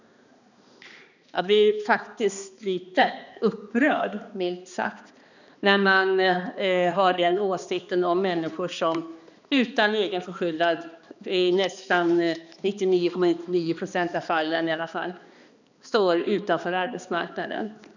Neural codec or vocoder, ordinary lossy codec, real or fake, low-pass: codec, 16 kHz, 2 kbps, X-Codec, HuBERT features, trained on balanced general audio; none; fake; 7.2 kHz